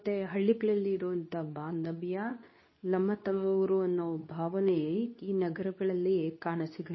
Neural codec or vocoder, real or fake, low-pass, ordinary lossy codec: codec, 24 kHz, 0.9 kbps, WavTokenizer, medium speech release version 2; fake; 7.2 kHz; MP3, 24 kbps